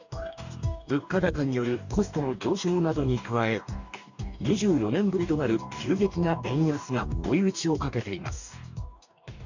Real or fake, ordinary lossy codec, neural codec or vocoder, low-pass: fake; none; codec, 44.1 kHz, 2.6 kbps, DAC; 7.2 kHz